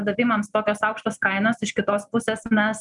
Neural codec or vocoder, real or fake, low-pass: none; real; 10.8 kHz